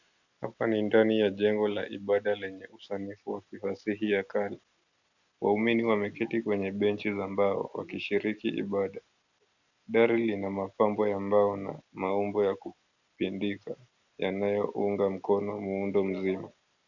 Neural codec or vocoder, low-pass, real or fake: none; 7.2 kHz; real